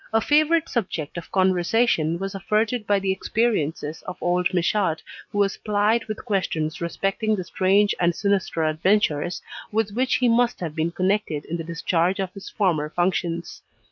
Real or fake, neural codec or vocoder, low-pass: real; none; 7.2 kHz